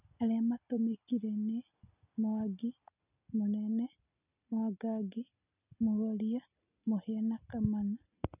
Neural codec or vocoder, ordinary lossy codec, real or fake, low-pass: none; none; real; 3.6 kHz